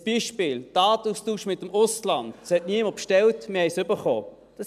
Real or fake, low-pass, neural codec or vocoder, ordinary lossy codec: real; 14.4 kHz; none; none